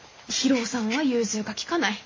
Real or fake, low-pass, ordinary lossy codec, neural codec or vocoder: real; 7.2 kHz; MP3, 32 kbps; none